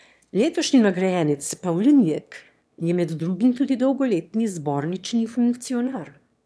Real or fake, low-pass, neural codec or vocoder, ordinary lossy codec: fake; none; autoencoder, 22.05 kHz, a latent of 192 numbers a frame, VITS, trained on one speaker; none